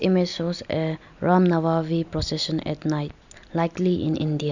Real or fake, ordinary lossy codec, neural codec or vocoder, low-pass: real; none; none; 7.2 kHz